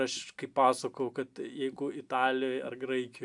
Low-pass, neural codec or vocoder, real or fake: 10.8 kHz; none; real